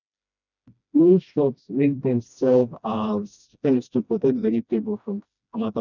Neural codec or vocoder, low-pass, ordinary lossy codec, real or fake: codec, 16 kHz, 1 kbps, FreqCodec, smaller model; 7.2 kHz; none; fake